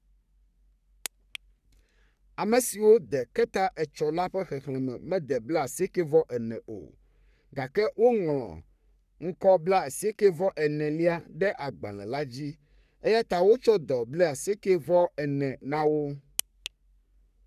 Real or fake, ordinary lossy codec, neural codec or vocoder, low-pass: fake; none; codec, 44.1 kHz, 3.4 kbps, Pupu-Codec; 14.4 kHz